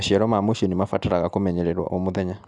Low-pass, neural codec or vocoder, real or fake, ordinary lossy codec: 10.8 kHz; none; real; none